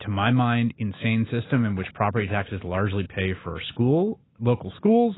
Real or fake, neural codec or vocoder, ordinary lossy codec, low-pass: real; none; AAC, 16 kbps; 7.2 kHz